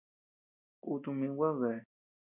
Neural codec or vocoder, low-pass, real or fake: none; 3.6 kHz; real